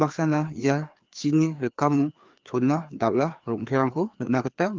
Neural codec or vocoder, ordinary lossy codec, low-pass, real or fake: codec, 16 kHz, 2 kbps, FreqCodec, larger model; Opus, 32 kbps; 7.2 kHz; fake